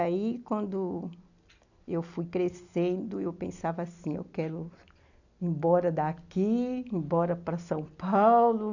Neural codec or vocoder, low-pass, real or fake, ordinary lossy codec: none; 7.2 kHz; real; none